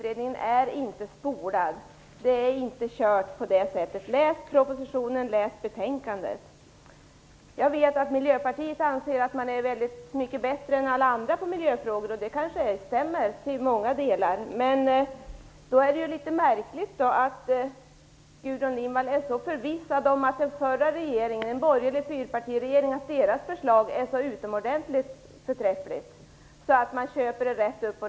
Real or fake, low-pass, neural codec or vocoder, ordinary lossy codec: real; none; none; none